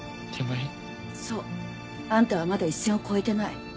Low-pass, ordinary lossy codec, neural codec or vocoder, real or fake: none; none; none; real